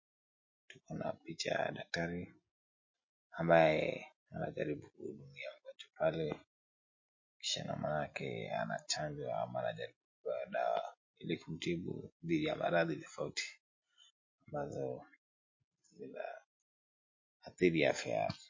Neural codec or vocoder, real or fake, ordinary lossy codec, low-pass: none; real; MP3, 32 kbps; 7.2 kHz